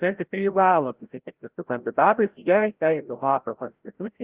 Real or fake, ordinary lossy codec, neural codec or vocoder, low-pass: fake; Opus, 16 kbps; codec, 16 kHz, 0.5 kbps, FreqCodec, larger model; 3.6 kHz